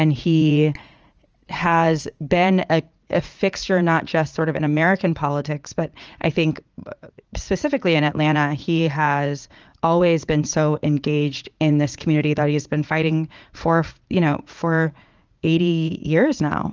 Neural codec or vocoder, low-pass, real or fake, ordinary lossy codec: vocoder, 44.1 kHz, 80 mel bands, Vocos; 7.2 kHz; fake; Opus, 24 kbps